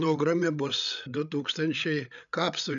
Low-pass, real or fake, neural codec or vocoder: 7.2 kHz; fake; codec, 16 kHz, 8 kbps, FreqCodec, larger model